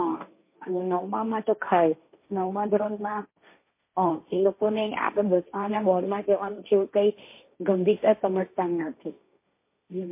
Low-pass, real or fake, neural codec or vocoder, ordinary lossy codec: 3.6 kHz; fake; codec, 16 kHz, 1.1 kbps, Voila-Tokenizer; MP3, 24 kbps